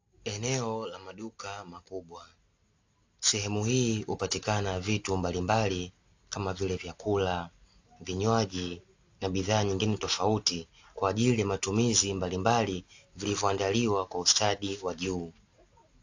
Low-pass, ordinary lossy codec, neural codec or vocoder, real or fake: 7.2 kHz; AAC, 48 kbps; none; real